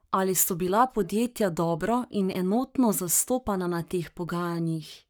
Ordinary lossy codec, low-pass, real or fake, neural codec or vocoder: none; none; fake; codec, 44.1 kHz, 7.8 kbps, Pupu-Codec